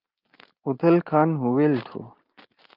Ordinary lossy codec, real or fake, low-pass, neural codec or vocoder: Opus, 24 kbps; real; 5.4 kHz; none